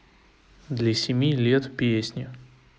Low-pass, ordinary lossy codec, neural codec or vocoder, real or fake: none; none; none; real